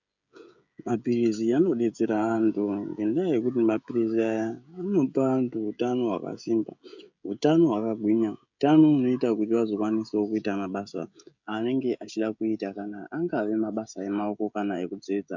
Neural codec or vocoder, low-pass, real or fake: codec, 16 kHz, 16 kbps, FreqCodec, smaller model; 7.2 kHz; fake